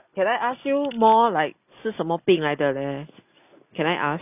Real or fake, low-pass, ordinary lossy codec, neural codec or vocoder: real; 3.6 kHz; none; none